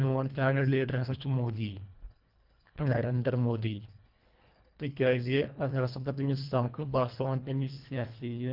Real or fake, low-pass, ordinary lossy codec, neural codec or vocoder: fake; 5.4 kHz; Opus, 32 kbps; codec, 24 kHz, 1.5 kbps, HILCodec